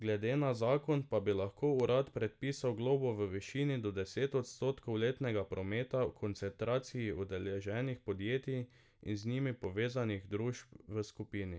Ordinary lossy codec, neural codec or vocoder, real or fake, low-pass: none; none; real; none